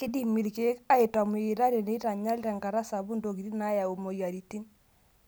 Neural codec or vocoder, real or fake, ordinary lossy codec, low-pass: none; real; none; none